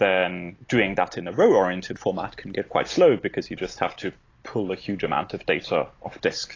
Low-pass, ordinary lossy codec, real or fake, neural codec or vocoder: 7.2 kHz; AAC, 32 kbps; real; none